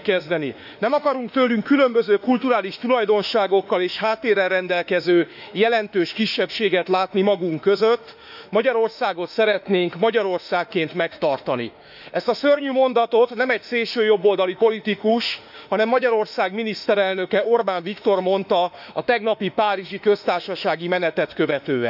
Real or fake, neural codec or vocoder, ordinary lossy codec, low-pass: fake; autoencoder, 48 kHz, 32 numbers a frame, DAC-VAE, trained on Japanese speech; none; 5.4 kHz